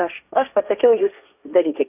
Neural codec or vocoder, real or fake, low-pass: codec, 16 kHz, 1.1 kbps, Voila-Tokenizer; fake; 3.6 kHz